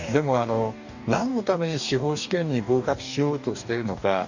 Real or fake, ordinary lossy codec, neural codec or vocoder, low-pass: fake; none; codec, 44.1 kHz, 2.6 kbps, DAC; 7.2 kHz